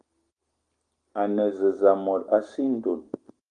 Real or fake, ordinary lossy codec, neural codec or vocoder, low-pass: real; Opus, 24 kbps; none; 9.9 kHz